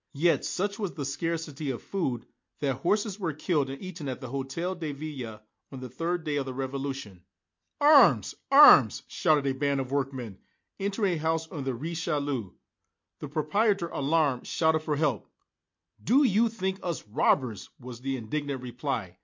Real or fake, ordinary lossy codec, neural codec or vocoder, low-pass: real; MP3, 48 kbps; none; 7.2 kHz